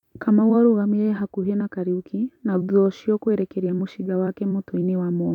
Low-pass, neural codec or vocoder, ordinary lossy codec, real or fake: 19.8 kHz; vocoder, 44.1 kHz, 128 mel bands every 256 samples, BigVGAN v2; none; fake